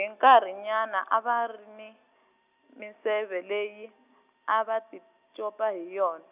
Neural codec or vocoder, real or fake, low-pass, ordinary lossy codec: none; real; 3.6 kHz; none